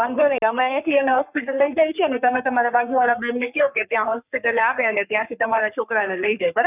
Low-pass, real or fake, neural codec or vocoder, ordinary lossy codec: 3.6 kHz; fake; codec, 44.1 kHz, 3.4 kbps, Pupu-Codec; none